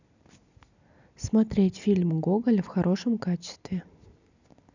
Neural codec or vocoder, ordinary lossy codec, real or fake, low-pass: none; none; real; 7.2 kHz